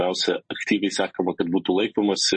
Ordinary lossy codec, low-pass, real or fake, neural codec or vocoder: MP3, 32 kbps; 9.9 kHz; real; none